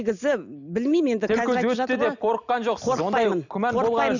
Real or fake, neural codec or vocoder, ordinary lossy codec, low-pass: real; none; none; 7.2 kHz